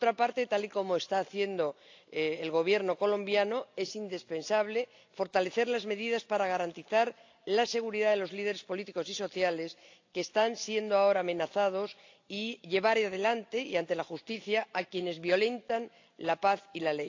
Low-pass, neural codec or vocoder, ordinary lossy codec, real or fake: 7.2 kHz; none; AAC, 48 kbps; real